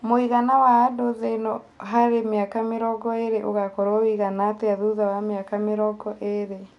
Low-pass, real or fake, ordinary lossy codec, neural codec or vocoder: 10.8 kHz; real; none; none